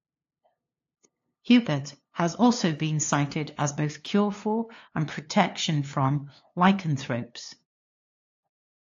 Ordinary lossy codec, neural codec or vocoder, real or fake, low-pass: AAC, 48 kbps; codec, 16 kHz, 2 kbps, FunCodec, trained on LibriTTS, 25 frames a second; fake; 7.2 kHz